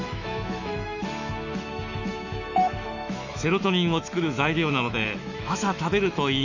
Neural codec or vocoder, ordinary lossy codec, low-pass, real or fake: autoencoder, 48 kHz, 128 numbers a frame, DAC-VAE, trained on Japanese speech; none; 7.2 kHz; fake